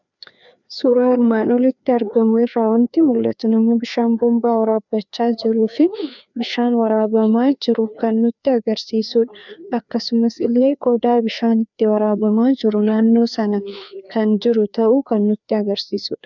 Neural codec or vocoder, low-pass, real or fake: codec, 16 kHz, 2 kbps, FreqCodec, larger model; 7.2 kHz; fake